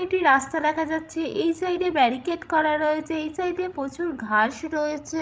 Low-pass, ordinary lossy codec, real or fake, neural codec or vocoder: none; none; fake; codec, 16 kHz, 16 kbps, FreqCodec, larger model